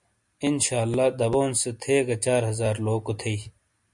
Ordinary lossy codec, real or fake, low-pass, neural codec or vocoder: MP3, 64 kbps; real; 10.8 kHz; none